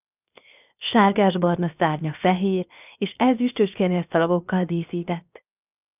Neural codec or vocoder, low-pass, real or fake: codec, 16 kHz, 0.7 kbps, FocalCodec; 3.6 kHz; fake